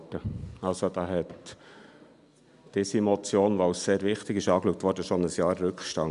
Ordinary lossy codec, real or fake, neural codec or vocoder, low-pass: none; real; none; 10.8 kHz